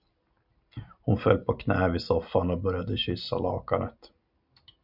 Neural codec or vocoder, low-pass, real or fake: none; 5.4 kHz; real